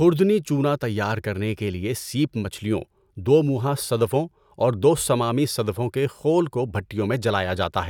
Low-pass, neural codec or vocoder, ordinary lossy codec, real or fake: 19.8 kHz; none; none; real